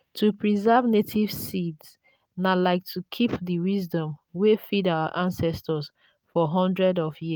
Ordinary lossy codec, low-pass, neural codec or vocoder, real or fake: none; none; none; real